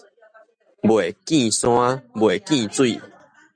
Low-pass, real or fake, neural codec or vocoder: 9.9 kHz; real; none